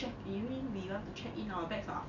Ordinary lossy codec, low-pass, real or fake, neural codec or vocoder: none; 7.2 kHz; real; none